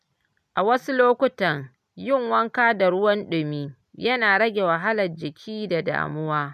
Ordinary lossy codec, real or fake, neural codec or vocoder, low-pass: none; real; none; 14.4 kHz